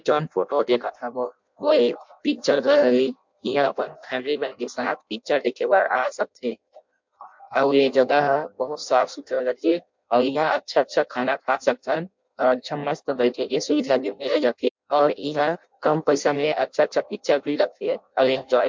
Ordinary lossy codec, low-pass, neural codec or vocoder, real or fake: MP3, 64 kbps; 7.2 kHz; codec, 16 kHz in and 24 kHz out, 0.6 kbps, FireRedTTS-2 codec; fake